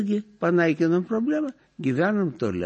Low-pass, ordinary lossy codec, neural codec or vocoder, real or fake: 10.8 kHz; MP3, 32 kbps; none; real